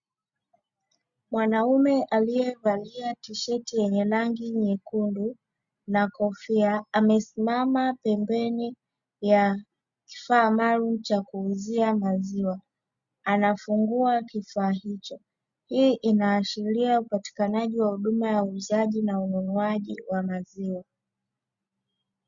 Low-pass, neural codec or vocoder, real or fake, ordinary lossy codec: 7.2 kHz; none; real; Opus, 64 kbps